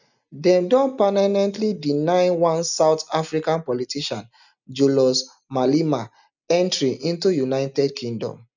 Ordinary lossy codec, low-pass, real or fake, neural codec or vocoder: none; 7.2 kHz; real; none